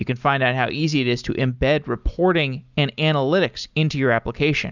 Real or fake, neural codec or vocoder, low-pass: real; none; 7.2 kHz